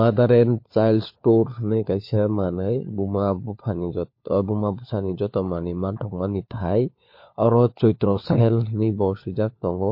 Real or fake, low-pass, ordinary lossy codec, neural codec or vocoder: fake; 5.4 kHz; MP3, 32 kbps; codec, 16 kHz, 4 kbps, FunCodec, trained on LibriTTS, 50 frames a second